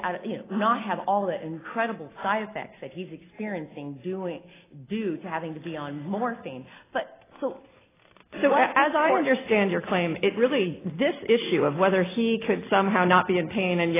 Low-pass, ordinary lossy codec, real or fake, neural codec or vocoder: 3.6 kHz; AAC, 16 kbps; real; none